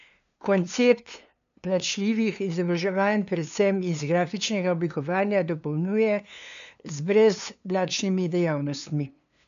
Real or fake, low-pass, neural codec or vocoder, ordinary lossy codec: fake; 7.2 kHz; codec, 16 kHz, 2 kbps, FunCodec, trained on LibriTTS, 25 frames a second; MP3, 96 kbps